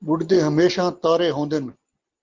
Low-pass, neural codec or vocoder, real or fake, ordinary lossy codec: 7.2 kHz; none; real; Opus, 32 kbps